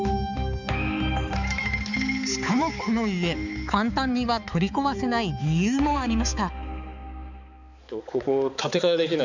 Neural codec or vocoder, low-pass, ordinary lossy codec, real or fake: codec, 16 kHz, 4 kbps, X-Codec, HuBERT features, trained on balanced general audio; 7.2 kHz; none; fake